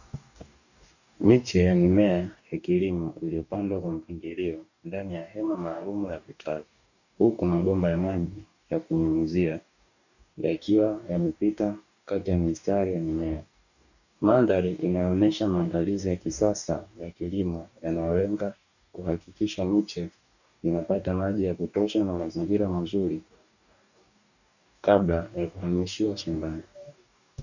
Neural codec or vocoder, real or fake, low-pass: codec, 44.1 kHz, 2.6 kbps, DAC; fake; 7.2 kHz